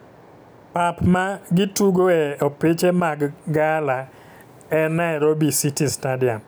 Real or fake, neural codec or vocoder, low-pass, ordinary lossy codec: real; none; none; none